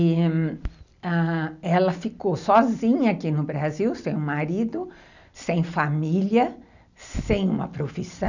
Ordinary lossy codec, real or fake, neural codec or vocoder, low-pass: none; real; none; 7.2 kHz